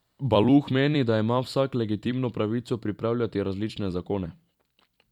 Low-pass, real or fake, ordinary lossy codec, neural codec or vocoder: 19.8 kHz; fake; none; vocoder, 44.1 kHz, 128 mel bands every 256 samples, BigVGAN v2